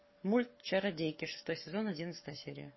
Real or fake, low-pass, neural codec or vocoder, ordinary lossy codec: fake; 7.2 kHz; codec, 44.1 kHz, 7.8 kbps, Pupu-Codec; MP3, 24 kbps